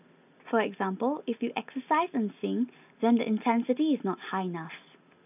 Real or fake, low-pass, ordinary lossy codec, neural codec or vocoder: real; 3.6 kHz; none; none